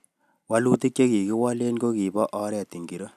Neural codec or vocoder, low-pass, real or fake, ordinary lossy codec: none; 14.4 kHz; real; none